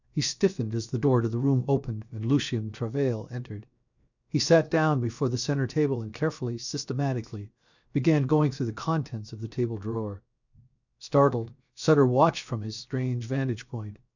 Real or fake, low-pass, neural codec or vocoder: fake; 7.2 kHz; codec, 16 kHz, 0.7 kbps, FocalCodec